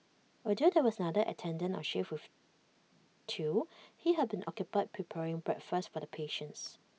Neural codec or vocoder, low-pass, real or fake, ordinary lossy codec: none; none; real; none